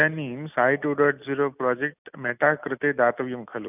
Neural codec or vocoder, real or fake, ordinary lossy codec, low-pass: none; real; none; 3.6 kHz